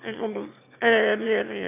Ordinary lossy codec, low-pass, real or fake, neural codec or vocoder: none; 3.6 kHz; fake; autoencoder, 22.05 kHz, a latent of 192 numbers a frame, VITS, trained on one speaker